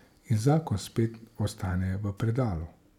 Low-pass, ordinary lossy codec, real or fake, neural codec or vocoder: 19.8 kHz; none; real; none